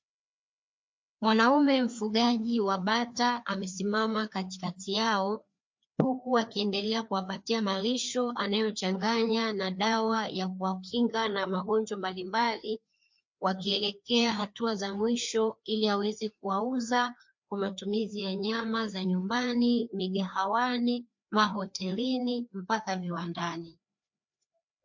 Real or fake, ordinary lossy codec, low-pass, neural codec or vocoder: fake; MP3, 48 kbps; 7.2 kHz; codec, 16 kHz, 2 kbps, FreqCodec, larger model